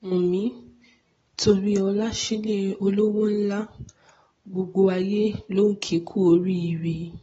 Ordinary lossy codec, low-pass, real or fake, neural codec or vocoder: AAC, 24 kbps; 19.8 kHz; real; none